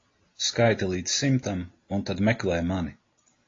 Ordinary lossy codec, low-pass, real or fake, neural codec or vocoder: AAC, 32 kbps; 7.2 kHz; real; none